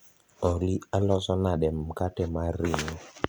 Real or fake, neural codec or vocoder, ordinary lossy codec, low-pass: real; none; none; none